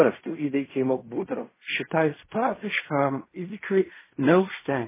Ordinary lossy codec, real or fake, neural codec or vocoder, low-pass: MP3, 16 kbps; fake; codec, 16 kHz in and 24 kHz out, 0.4 kbps, LongCat-Audio-Codec, fine tuned four codebook decoder; 3.6 kHz